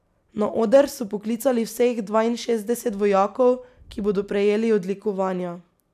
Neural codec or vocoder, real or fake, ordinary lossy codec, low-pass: none; real; none; 14.4 kHz